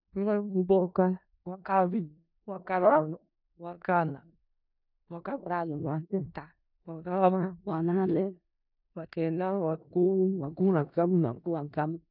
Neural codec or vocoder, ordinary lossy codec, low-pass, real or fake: codec, 16 kHz in and 24 kHz out, 0.4 kbps, LongCat-Audio-Codec, four codebook decoder; none; 5.4 kHz; fake